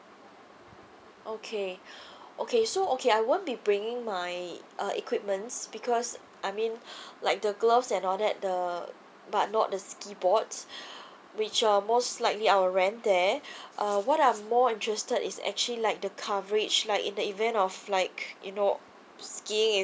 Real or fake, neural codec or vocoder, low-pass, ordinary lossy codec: real; none; none; none